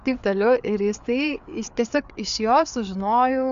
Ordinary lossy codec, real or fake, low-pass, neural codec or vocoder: AAC, 96 kbps; fake; 7.2 kHz; codec, 16 kHz, 4 kbps, FreqCodec, larger model